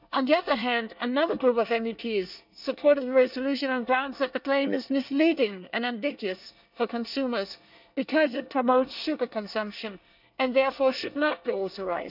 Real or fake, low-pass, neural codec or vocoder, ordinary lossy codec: fake; 5.4 kHz; codec, 24 kHz, 1 kbps, SNAC; none